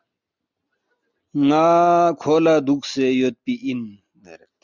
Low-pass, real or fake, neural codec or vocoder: 7.2 kHz; real; none